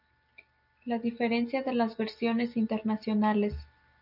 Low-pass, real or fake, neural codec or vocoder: 5.4 kHz; real; none